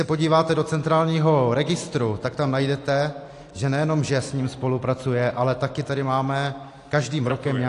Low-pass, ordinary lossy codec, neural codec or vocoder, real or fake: 10.8 kHz; AAC, 48 kbps; none; real